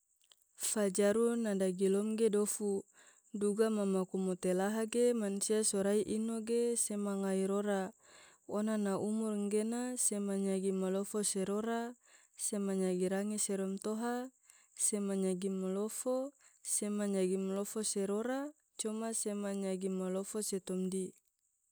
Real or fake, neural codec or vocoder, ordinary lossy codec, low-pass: real; none; none; none